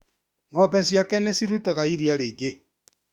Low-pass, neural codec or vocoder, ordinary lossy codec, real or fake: 19.8 kHz; autoencoder, 48 kHz, 32 numbers a frame, DAC-VAE, trained on Japanese speech; Opus, 64 kbps; fake